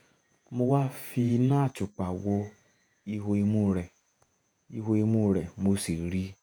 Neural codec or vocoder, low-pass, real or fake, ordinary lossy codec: vocoder, 48 kHz, 128 mel bands, Vocos; none; fake; none